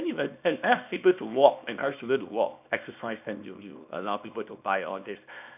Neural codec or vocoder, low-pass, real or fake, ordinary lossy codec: codec, 24 kHz, 0.9 kbps, WavTokenizer, small release; 3.6 kHz; fake; none